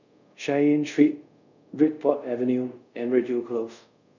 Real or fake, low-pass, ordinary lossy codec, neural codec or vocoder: fake; 7.2 kHz; none; codec, 24 kHz, 0.5 kbps, DualCodec